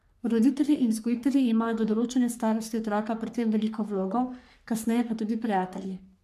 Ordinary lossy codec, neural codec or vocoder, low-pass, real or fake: MP3, 96 kbps; codec, 44.1 kHz, 3.4 kbps, Pupu-Codec; 14.4 kHz; fake